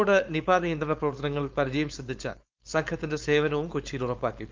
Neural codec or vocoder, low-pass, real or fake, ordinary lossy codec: codec, 16 kHz, 4.8 kbps, FACodec; 7.2 kHz; fake; Opus, 24 kbps